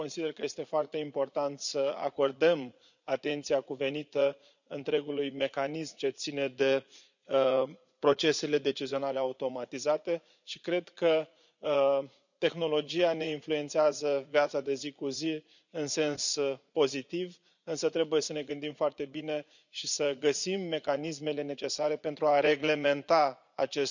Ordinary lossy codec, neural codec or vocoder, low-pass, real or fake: none; vocoder, 44.1 kHz, 80 mel bands, Vocos; 7.2 kHz; fake